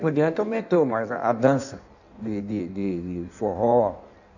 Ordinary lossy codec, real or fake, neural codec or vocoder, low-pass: none; fake; codec, 16 kHz in and 24 kHz out, 1.1 kbps, FireRedTTS-2 codec; 7.2 kHz